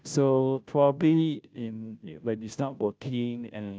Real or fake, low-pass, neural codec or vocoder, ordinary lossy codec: fake; none; codec, 16 kHz, 0.5 kbps, FunCodec, trained on Chinese and English, 25 frames a second; none